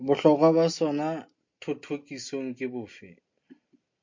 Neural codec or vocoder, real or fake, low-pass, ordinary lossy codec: none; real; 7.2 kHz; MP3, 48 kbps